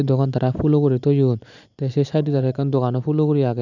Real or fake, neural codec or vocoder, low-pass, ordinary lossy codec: fake; vocoder, 44.1 kHz, 128 mel bands every 256 samples, BigVGAN v2; 7.2 kHz; none